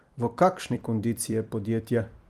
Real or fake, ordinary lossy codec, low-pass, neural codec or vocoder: fake; Opus, 32 kbps; 14.4 kHz; vocoder, 44.1 kHz, 128 mel bands every 256 samples, BigVGAN v2